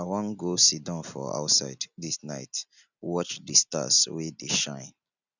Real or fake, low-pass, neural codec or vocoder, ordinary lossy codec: real; 7.2 kHz; none; none